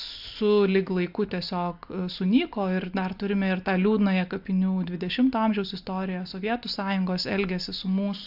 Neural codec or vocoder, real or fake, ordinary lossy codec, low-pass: none; real; AAC, 48 kbps; 5.4 kHz